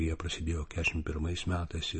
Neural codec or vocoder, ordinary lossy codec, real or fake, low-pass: none; MP3, 32 kbps; real; 9.9 kHz